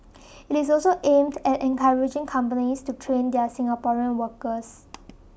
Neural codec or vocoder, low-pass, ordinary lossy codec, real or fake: none; none; none; real